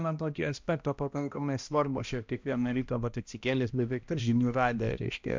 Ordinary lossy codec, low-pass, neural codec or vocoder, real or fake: MP3, 64 kbps; 7.2 kHz; codec, 16 kHz, 1 kbps, X-Codec, HuBERT features, trained on balanced general audio; fake